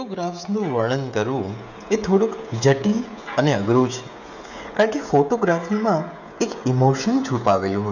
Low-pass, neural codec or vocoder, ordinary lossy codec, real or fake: 7.2 kHz; codec, 16 kHz, 16 kbps, FreqCodec, smaller model; none; fake